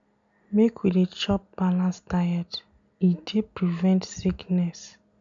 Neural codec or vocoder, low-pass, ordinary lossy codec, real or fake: none; 7.2 kHz; none; real